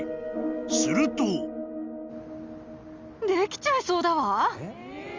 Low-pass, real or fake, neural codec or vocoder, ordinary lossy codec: 7.2 kHz; real; none; Opus, 32 kbps